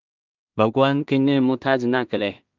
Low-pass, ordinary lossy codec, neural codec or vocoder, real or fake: 7.2 kHz; Opus, 24 kbps; codec, 16 kHz in and 24 kHz out, 0.4 kbps, LongCat-Audio-Codec, two codebook decoder; fake